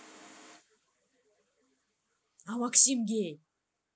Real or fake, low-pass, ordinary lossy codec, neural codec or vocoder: real; none; none; none